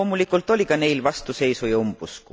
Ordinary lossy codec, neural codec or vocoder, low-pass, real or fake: none; none; none; real